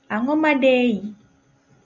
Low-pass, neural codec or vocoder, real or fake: 7.2 kHz; none; real